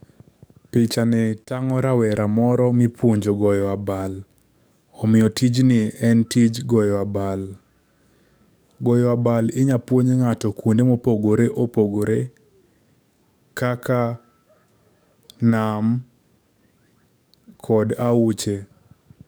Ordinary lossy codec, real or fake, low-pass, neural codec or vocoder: none; fake; none; codec, 44.1 kHz, 7.8 kbps, DAC